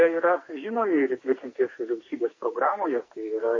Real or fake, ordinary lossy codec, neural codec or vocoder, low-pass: fake; MP3, 32 kbps; codec, 44.1 kHz, 2.6 kbps, SNAC; 7.2 kHz